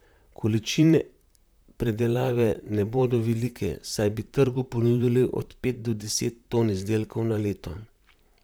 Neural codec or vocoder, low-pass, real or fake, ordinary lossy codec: vocoder, 44.1 kHz, 128 mel bands, Pupu-Vocoder; none; fake; none